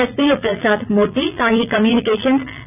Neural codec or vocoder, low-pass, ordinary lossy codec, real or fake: vocoder, 44.1 kHz, 80 mel bands, Vocos; 3.6 kHz; none; fake